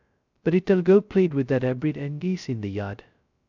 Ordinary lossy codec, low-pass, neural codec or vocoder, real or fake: none; 7.2 kHz; codec, 16 kHz, 0.2 kbps, FocalCodec; fake